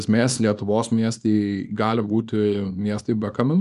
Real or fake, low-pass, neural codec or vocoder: fake; 10.8 kHz; codec, 24 kHz, 0.9 kbps, WavTokenizer, small release